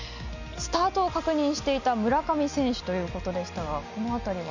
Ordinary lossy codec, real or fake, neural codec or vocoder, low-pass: none; real; none; 7.2 kHz